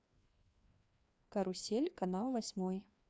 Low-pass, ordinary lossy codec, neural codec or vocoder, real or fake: none; none; codec, 16 kHz, 2 kbps, FreqCodec, larger model; fake